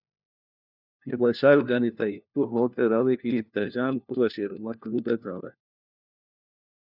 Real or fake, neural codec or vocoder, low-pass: fake; codec, 16 kHz, 1 kbps, FunCodec, trained on LibriTTS, 50 frames a second; 5.4 kHz